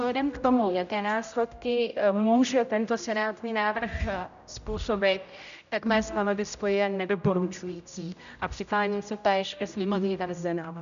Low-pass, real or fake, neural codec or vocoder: 7.2 kHz; fake; codec, 16 kHz, 0.5 kbps, X-Codec, HuBERT features, trained on general audio